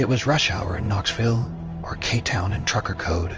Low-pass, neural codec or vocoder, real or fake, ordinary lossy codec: 7.2 kHz; codec, 16 kHz in and 24 kHz out, 1 kbps, XY-Tokenizer; fake; Opus, 24 kbps